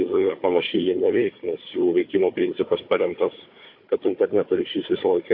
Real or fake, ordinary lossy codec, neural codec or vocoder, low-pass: fake; MP3, 32 kbps; codec, 16 kHz, 4 kbps, FunCodec, trained on Chinese and English, 50 frames a second; 5.4 kHz